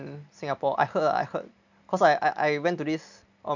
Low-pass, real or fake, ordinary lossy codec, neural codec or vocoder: 7.2 kHz; real; none; none